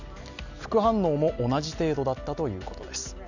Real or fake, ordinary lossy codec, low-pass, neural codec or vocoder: real; none; 7.2 kHz; none